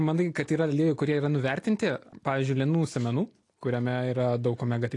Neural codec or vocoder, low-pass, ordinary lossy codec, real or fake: none; 10.8 kHz; AAC, 48 kbps; real